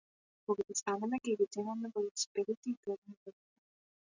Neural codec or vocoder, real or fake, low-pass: none; real; 7.2 kHz